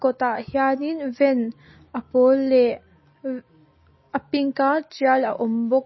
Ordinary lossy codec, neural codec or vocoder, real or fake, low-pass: MP3, 24 kbps; none; real; 7.2 kHz